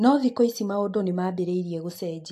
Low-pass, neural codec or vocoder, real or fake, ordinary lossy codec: 14.4 kHz; none; real; AAC, 64 kbps